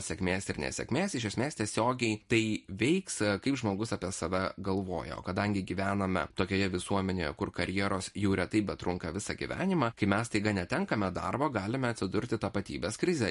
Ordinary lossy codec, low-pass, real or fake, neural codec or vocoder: MP3, 48 kbps; 14.4 kHz; real; none